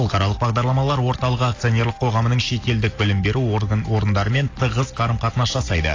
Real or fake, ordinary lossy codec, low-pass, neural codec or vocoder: real; AAC, 32 kbps; 7.2 kHz; none